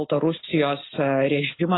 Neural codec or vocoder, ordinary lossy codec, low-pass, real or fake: none; AAC, 16 kbps; 7.2 kHz; real